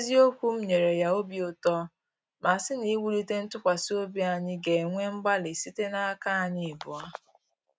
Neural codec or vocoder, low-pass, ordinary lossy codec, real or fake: none; none; none; real